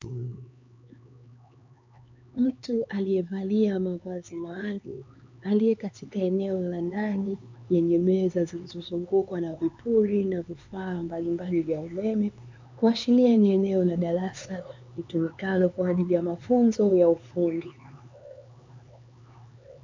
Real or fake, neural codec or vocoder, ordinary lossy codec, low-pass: fake; codec, 16 kHz, 4 kbps, X-Codec, HuBERT features, trained on LibriSpeech; MP3, 64 kbps; 7.2 kHz